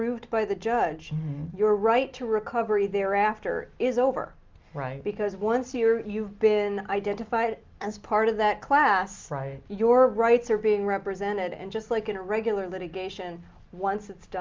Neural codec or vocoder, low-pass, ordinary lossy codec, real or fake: none; 7.2 kHz; Opus, 32 kbps; real